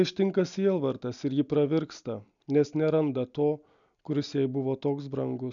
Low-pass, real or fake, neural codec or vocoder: 7.2 kHz; real; none